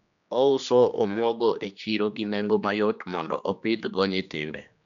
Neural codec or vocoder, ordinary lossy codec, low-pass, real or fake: codec, 16 kHz, 2 kbps, X-Codec, HuBERT features, trained on general audio; none; 7.2 kHz; fake